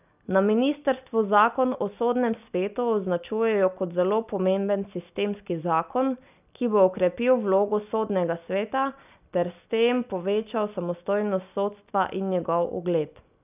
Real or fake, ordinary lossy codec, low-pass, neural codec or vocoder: real; none; 3.6 kHz; none